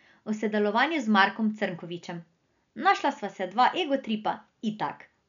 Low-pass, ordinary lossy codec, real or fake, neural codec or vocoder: 7.2 kHz; none; real; none